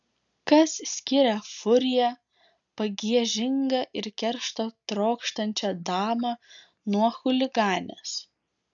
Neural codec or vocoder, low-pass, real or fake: none; 7.2 kHz; real